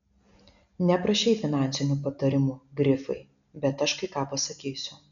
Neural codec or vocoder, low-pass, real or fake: none; 7.2 kHz; real